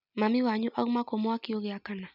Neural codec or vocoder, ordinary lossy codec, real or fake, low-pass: none; none; real; 5.4 kHz